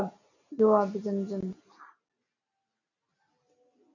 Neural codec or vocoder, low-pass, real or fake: none; 7.2 kHz; real